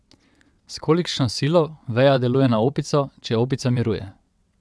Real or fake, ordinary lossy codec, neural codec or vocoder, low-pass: fake; none; vocoder, 22.05 kHz, 80 mel bands, WaveNeXt; none